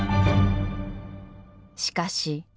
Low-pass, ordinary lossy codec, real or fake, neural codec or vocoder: none; none; real; none